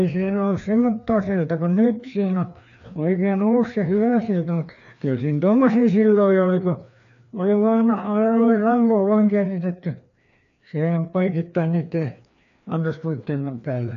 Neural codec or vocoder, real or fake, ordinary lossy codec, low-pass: codec, 16 kHz, 2 kbps, FreqCodec, larger model; fake; MP3, 64 kbps; 7.2 kHz